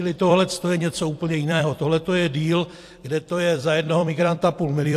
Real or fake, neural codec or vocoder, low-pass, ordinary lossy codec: fake; vocoder, 44.1 kHz, 128 mel bands every 256 samples, BigVGAN v2; 14.4 kHz; AAC, 64 kbps